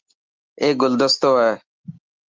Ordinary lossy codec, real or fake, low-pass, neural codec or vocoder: Opus, 24 kbps; real; 7.2 kHz; none